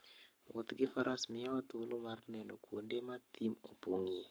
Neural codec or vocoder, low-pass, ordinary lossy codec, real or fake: codec, 44.1 kHz, 7.8 kbps, Pupu-Codec; none; none; fake